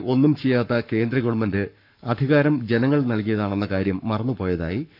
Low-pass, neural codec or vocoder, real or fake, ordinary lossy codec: 5.4 kHz; codec, 44.1 kHz, 7.8 kbps, Pupu-Codec; fake; none